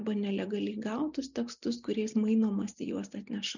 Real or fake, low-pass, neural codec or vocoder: real; 7.2 kHz; none